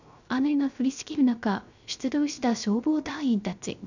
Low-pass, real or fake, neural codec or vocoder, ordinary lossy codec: 7.2 kHz; fake; codec, 16 kHz, 0.3 kbps, FocalCodec; none